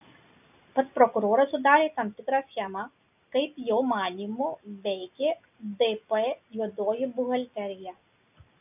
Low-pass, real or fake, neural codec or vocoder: 3.6 kHz; real; none